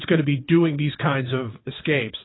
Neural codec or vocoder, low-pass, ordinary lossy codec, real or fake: vocoder, 22.05 kHz, 80 mel bands, WaveNeXt; 7.2 kHz; AAC, 16 kbps; fake